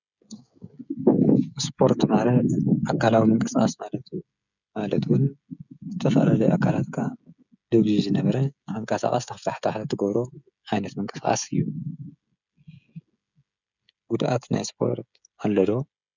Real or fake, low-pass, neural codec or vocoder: fake; 7.2 kHz; codec, 16 kHz, 16 kbps, FreqCodec, smaller model